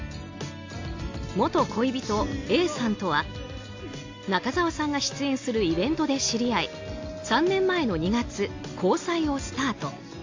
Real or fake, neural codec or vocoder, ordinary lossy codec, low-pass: real; none; AAC, 48 kbps; 7.2 kHz